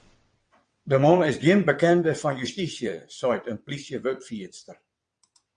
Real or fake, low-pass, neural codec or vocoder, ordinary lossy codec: fake; 9.9 kHz; vocoder, 22.05 kHz, 80 mel bands, WaveNeXt; MP3, 64 kbps